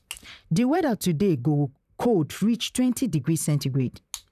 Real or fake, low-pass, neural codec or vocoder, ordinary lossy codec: fake; 14.4 kHz; vocoder, 44.1 kHz, 128 mel bands, Pupu-Vocoder; none